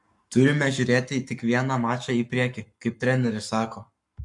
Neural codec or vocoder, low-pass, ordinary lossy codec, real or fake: codec, 44.1 kHz, 7.8 kbps, Pupu-Codec; 10.8 kHz; MP3, 64 kbps; fake